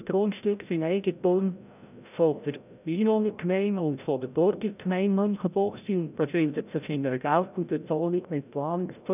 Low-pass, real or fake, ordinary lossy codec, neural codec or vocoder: 3.6 kHz; fake; none; codec, 16 kHz, 0.5 kbps, FreqCodec, larger model